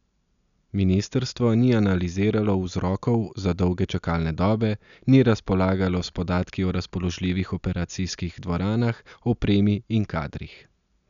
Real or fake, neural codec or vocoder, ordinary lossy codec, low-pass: real; none; none; 7.2 kHz